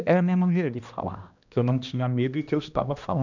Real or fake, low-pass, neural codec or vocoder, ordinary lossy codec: fake; 7.2 kHz; codec, 16 kHz, 1 kbps, X-Codec, HuBERT features, trained on balanced general audio; none